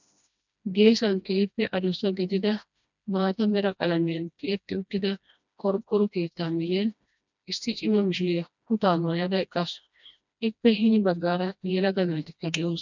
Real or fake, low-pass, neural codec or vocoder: fake; 7.2 kHz; codec, 16 kHz, 1 kbps, FreqCodec, smaller model